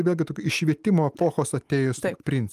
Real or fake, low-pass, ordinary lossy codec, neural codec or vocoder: real; 14.4 kHz; Opus, 32 kbps; none